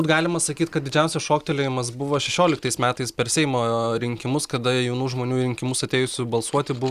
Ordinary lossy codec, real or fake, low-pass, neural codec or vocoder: Opus, 64 kbps; real; 14.4 kHz; none